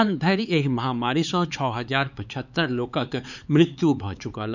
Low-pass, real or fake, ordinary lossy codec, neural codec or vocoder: 7.2 kHz; fake; none; codec, 16 kHz, 4 kbps, X-Codec, HuBERT features, trained on LibriSpeech